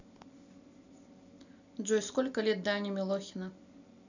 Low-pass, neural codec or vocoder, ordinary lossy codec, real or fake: 7.2 kHz; none; none; real